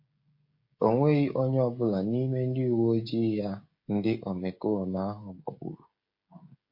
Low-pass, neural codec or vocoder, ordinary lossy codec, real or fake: 5.4 kHz; codec, 16 kHz, 16 kbps, FreqCodec, smaller model; MP3, 32 kbps; fake